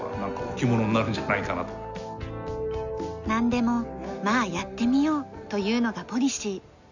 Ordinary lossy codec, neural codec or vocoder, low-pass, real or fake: none; none; 7.2 kHz; real